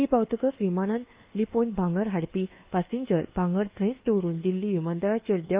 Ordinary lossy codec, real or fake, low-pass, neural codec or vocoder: Opus, 24 kbps; fake; 3.6 kHz; codec, 24 kHz, 1.2 kbps, DualCodec